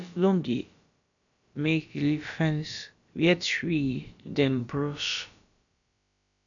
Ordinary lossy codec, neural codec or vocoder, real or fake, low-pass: none; codec, 16 kHz, about 1 kbps, DyCAST, with the encoder's durations; fake; 7.2 kHz